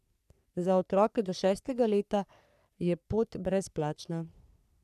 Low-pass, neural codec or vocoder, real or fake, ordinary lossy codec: 14.4 kHz; codec, 44.1 kHz, 3.4 kbps, Pupu-Codec; fake; none